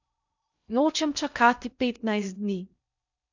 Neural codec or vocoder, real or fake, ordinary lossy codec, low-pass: codec, 16 kHz in and 24 kHz out, 0.6 kbps, FocalCodec, streaming, 2048 codes; fake; none; 7.2 kHz